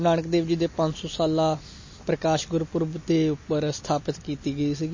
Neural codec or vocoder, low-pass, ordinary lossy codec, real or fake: none; 7.2 kHz; MP3, 32 kbps; real